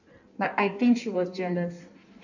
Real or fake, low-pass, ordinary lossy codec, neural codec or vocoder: fake; 7.2 kHz; none; codec, 16 kHz in and 24 kHz out, 1.1 kbps, FireRedTTS-2 codec